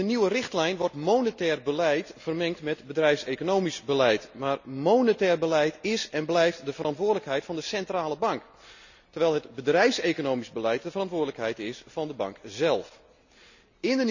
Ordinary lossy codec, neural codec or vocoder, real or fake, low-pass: none; none; real; 7.2 kHz